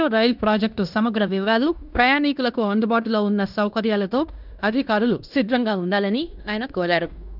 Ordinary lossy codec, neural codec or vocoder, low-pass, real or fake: none; codec, 16 kHz in and 24 kHz out, 0.9 kbps, LongCat-Audio-Codec, fine tuned four codebook decoder; 5.4 kHz; fake